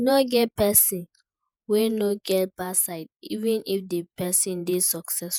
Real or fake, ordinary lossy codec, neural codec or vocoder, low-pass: fake; none; vocoder, 48 kHz, 128 mel bands, Vocos; none